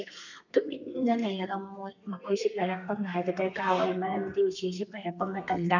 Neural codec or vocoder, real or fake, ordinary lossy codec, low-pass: codec, 32 kHz, 1.9 kbps, SNAC; fake; none; 7.2 kHz